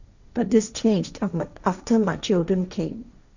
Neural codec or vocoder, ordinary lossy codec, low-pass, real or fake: codec, 16 kHz, 1.1 kbps, Voila-Tokenizer; none; 7.2 kHz; fake